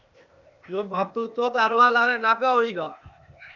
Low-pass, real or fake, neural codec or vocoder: 7.2 kHz; fake; codec, 16 kHz, 0.8 kbps, ZipCodec